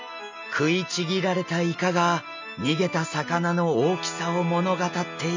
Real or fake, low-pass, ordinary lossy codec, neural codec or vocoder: real; 7.2 kHz; MP3, 64 kbps; none